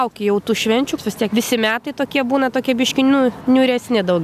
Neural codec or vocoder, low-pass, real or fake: none; 14.4 kHz; real